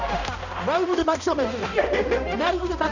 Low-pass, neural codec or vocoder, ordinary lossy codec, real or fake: 7.2 kHz; codec, 16 kHz, 1 kbps, X-Codec, HuBERT features, trained on balanced general audio; none; fake